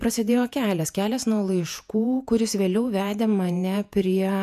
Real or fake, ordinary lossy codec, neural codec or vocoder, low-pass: fake; MP3, 96 kbps; vocoder, 48 kHz, 128 mel bands, Vocos; 14.4 kHz